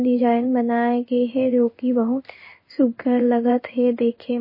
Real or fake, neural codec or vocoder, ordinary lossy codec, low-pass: fake; codec, 16 kHz in and 24 kHz out, 1 kbps, XY-Tokenizer; MP3, 24 kbps; 5.4 kHz